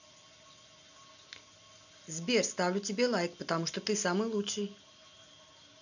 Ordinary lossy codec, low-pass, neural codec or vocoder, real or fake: none; 7.2 kHz; none; real